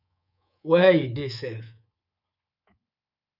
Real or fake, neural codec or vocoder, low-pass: fake; codec, 24 kHz, 3.1 kbps, DualCodec; 5.4 kHz